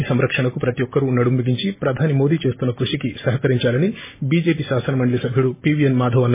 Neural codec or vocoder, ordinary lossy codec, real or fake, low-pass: none; MP3, 16 kbps; real; 3.6 kHz